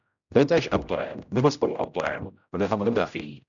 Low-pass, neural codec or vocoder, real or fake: 7.2 kHz; codec, 16 kHz, 0.5 kbps, X-Codec, HuBERT features, trained on general audio; fake